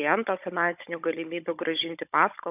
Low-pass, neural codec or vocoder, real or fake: 3.6 kHz; codec, 16 kHz, 16 kbps, FunCodec, trained on Chinese and English, 50 frames a second; fake